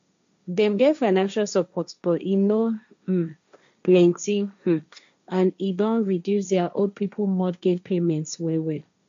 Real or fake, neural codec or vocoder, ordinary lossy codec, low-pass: fake; codec, 16 kHz, 1.1 kbps, Voila-Tokenizer; MP3, 64 kbps; 7.2 kHz